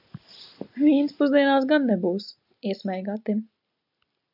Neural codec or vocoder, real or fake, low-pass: none; real; 5.4 kHz